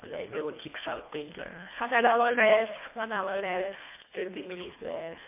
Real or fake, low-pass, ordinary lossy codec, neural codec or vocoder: fake; 3.6 kHz; MP3, 24 kbps; codec, 24 kHz, 1.5 kbps, HILCodec